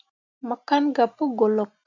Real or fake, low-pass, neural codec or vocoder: real; 7.2 kHz; none